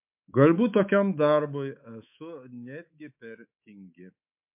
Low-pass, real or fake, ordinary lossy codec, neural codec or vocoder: 3.6 kHz; fake; MP3, 32 kbps; codec, 24 kHz, 3.1 kbps, DualCodec